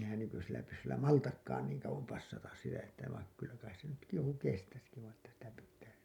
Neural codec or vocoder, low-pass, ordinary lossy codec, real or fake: none; 19.8 kHz; none; real